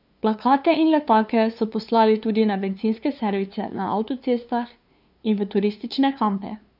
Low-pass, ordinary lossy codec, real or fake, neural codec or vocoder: 5.4 kHz; none; fake; codec, 16 kHz, 2 kbps, FunCodec, trained on LibriTTS, 25 frames a second